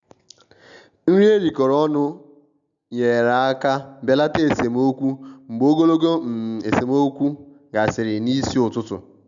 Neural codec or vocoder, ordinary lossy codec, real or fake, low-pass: none; none; real; 7.2 kHz